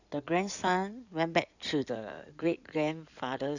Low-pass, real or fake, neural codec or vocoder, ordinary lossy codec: 7.2 kHz; fake; codec, 16 kHz in and 24 kHz out, 2.2 kbps, FireRedTTS-2 codec; none